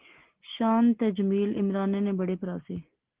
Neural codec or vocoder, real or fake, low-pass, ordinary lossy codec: none; real; 3.6 kHz; Opus, 16 kbps